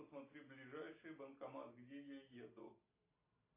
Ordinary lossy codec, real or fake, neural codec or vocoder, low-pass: AAC, 24 kbps; real; none; 3.6 kHz